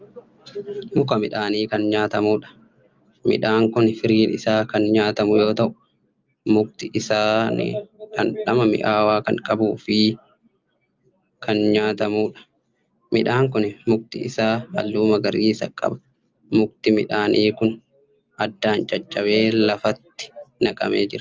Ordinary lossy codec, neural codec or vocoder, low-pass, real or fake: Opus, 24 kbps; none; 7.2 kHz; real